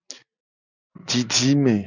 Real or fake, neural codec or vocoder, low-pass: real; none; 7.2 kHz